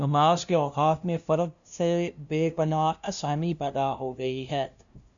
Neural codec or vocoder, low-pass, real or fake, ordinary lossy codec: codec, 16 kHz, 0.5 kbps, FunCodec, trained on LibriTTS, 25 frames a second; 7.2 kHz; fake; MP3, 96 kbps